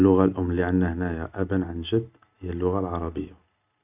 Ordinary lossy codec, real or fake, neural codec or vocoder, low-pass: none; real; none; 3.6 kHz